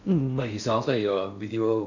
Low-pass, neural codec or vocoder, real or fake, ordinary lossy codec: 7.2 kHz; codec, 16 kHz in and 24 kHz out, 0.6 kbps, FocalCodec, streaming, 4096 codes; fake; none